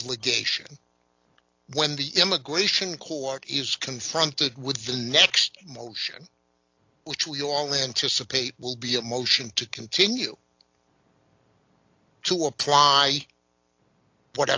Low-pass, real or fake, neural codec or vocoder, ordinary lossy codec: 7.2 kHz; real; none; AAC, 48 kbps